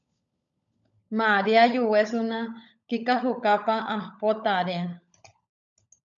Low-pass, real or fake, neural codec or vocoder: 7.2 kHz; fake; codec, 16 kHz, 16 kbps, FunCodec, trained on LibriTTS, 50 frames a second